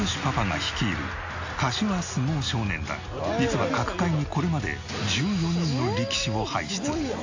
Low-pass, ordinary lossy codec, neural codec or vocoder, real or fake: 7.2 kHz; AAC, 48 kbps; none; real